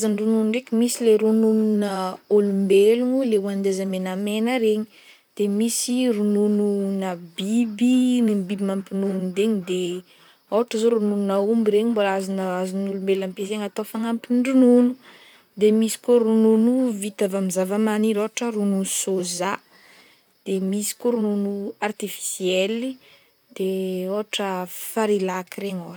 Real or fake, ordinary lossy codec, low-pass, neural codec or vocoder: fake; none; none; vocoder, 44.1 kHz, 128 mel bands, Pupu-Vocoder